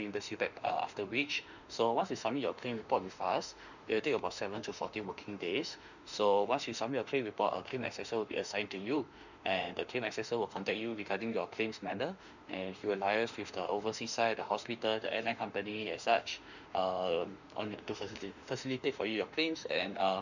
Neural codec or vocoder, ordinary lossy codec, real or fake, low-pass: autoencoder, 48 kHz, 32 numbers a frame, DAC-VAE, trained on Japanese speech; none; fake; 7.2 kHz